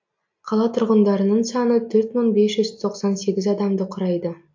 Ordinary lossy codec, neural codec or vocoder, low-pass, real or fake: MP3, 48 kbps; none; 7.2 kHz; real